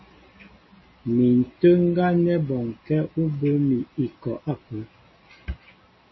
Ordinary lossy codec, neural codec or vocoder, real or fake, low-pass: MP3, 24 kbps; none; real; 7.2 kHz